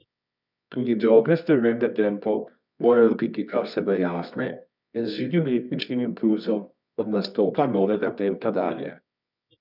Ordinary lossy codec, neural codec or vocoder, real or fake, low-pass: none; codec, 24 kHz, 0.9 kbps, WavTokenizer, medium music audio release; fake; 5.4 kHz